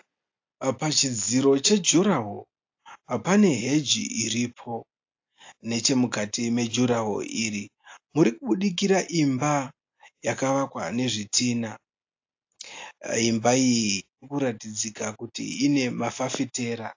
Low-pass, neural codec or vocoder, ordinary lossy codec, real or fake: 7.2 kHz; none; AAC, 48 kbps; real